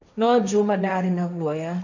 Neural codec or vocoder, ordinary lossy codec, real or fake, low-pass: codec, 16 kHz, 1.1 kbps, Voila-Tokenizer; none; fake; 7.2 kHz